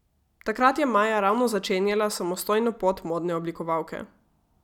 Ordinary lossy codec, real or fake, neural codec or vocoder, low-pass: none; real; none; 19.8 kHz